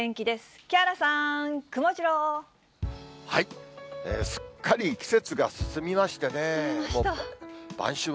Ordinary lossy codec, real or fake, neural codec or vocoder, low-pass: none; real; none; none